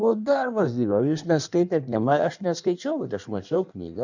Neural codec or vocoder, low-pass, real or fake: codec, 16 kHz in and 24 kHz out, 1.1 kbps, FireRedTTS-2 codec; 7.2 kHz; fake